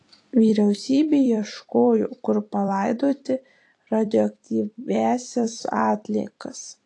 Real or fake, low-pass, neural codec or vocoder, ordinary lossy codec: fake; 10.8 kHz; vocoder, 44.1 kHz, 128 mel bands every 256 samples, BigVGAN v2; AAC, 48 kbps